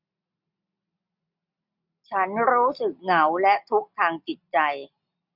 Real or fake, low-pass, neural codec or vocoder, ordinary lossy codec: real; 5.4 kHz; none; none